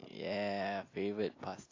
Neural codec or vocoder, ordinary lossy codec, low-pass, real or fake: none; AAC, 32 kbps; 7.2 kHz; real